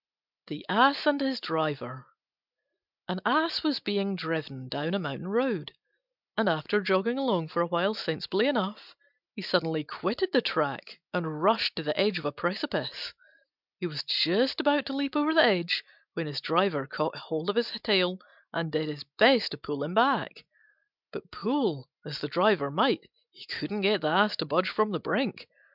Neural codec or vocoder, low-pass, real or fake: none; 5.4 kHz; real